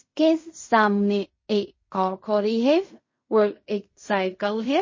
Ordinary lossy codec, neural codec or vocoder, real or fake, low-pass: MP3, 32 kbps; codec, 16 kHz in and 24 kHz out, 0.4 kbps, LongCat-Audio-Codec, fine tuned four codebook decoder; fake; 7.2 kHz